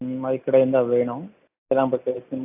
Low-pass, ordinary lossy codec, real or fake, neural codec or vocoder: 3.6 kHz; AAC, 32 kbps; real; none